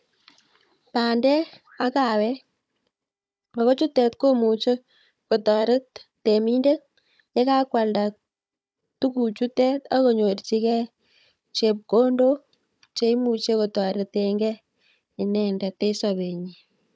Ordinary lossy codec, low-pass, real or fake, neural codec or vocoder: none; none; fake; codec, 16 kHz, 16 kbps, FunCodec, trained on Chinese and English, 50 frames a second